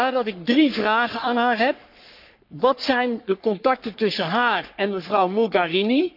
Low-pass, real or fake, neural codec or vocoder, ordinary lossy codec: 5.4 kHz; fake; codec, 44.1 kHz, 3.4 kbps, Pupu-Codec; none